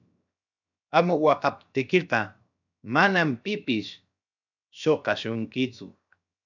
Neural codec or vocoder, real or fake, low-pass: codec, 16 kHz, 0.7 kbps, FocalCodec; fake; 7.2 kHz